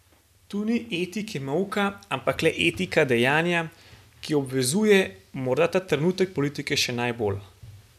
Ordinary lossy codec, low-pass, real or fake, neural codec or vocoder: none; 14.4 kHz; real; none